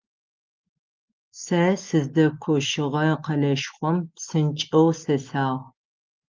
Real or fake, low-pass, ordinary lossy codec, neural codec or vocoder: real; 7.2 kHz; Opus, 32 kbps; none